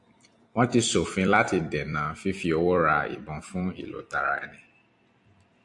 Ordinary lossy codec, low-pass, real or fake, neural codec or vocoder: AAC, 64 kbps; 10.8 kHz; fake; vocoder, 44.1 kHz, 128 mel bands every 256 samples, BigVGAN v2